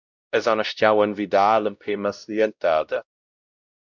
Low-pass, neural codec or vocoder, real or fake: 7.2 kHz; codec, 16 kHz, 0.5 kbps, X-Codec, WavLM features, trained on Multilingual LibriSpeech; fake